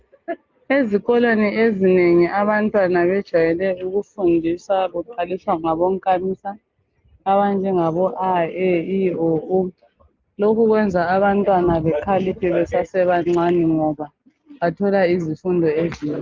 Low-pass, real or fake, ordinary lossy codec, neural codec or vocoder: 7.2 kHz; real; Opus, 24 kbps; none